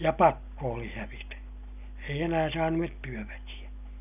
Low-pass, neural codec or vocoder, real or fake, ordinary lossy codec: 3.6 kHz; none; real; none